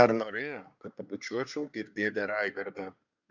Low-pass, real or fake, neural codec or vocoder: 7.2 kHz; fake; codec, 24 kHz, 1 kbps, SNAC